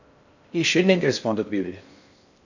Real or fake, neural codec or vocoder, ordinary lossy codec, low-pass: fake; codec, 16 kHz in and 24 kHz out, 0.6 kbps, FocalCodec, streaming, 4096 codes; none; 7.2 kHz